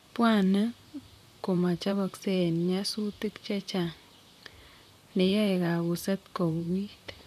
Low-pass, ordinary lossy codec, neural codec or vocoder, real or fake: 14.4 kHz; none; vocoder, 44.1 kHz, 128 mel bands, Pupu-Vocoder; fake